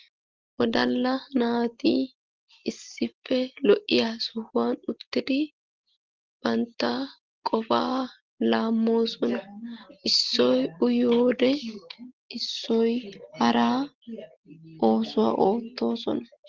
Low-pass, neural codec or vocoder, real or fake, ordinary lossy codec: 7.2 kHz; none; real; Opus, 16 kbps